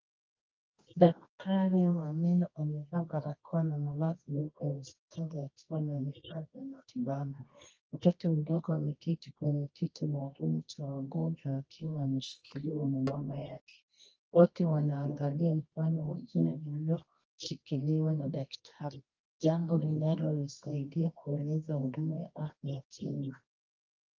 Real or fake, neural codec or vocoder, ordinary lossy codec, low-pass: fake; codec, 24 kHz, 0.9 kbps, WavTokenizer, medium music audio release; Opus, 24 kbps; 7.2 kHz